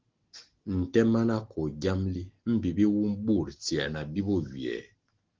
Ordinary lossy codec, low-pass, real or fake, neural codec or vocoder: Opus, 16 kbps; 7.2 kHz; real; none